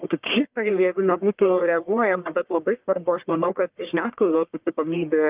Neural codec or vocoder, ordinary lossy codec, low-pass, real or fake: codec, 44.1 kHz, 1.7 kbps, Pupu-Codec; Opus, 32 kbps; 3.6 kHz; fake